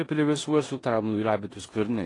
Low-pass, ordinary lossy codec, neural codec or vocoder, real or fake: 10.8 kHz; AAC, 32 kbps; codec, 16 kHz in and 24 kHz out, 0.9 kbps, LongCat-Audio-Codec, four codebook decoder; fake